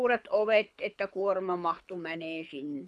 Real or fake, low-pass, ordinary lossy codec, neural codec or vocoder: fake; none; none; codec, 24 kHz, 6 kbps, HILCodec